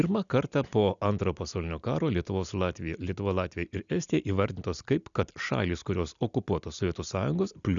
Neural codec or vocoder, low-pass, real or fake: none; 7.2 kHz; real